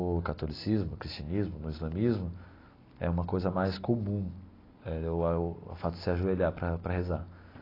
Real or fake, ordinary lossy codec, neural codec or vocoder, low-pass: real; AAC, 24 kbps; none; 5.4 kHz